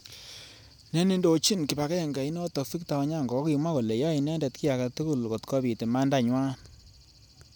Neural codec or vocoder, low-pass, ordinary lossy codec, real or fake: none; none; none; real